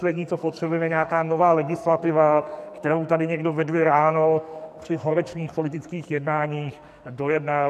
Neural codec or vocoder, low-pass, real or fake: codec, 44.1 kHz, 2.6 kbps, SNAC; 14.4 kHz; fake